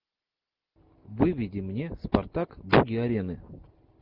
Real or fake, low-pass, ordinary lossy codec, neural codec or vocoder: real; 5.4 kHz; Opus, 24 kbps; none